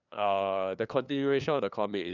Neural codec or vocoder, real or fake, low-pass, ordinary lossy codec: codec, 16 kHz, 2 kbps, FunCodec, trained on LibriTTS, 25 frames a second; fake; 7.2 kHz; none